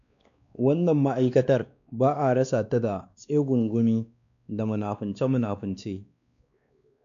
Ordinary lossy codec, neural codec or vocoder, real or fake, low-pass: none; codec, 16 kHz, 2 kbps, X-Codec, WavLM features, trained on Multilingual LibriSpeech; fake; 7.2 kHz